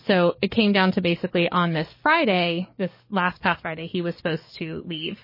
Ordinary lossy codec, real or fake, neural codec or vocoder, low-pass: MP3, 24 kbps; fake; codec, 44.1 kHz, 7.8 kbps, DAC; 5.4 kHz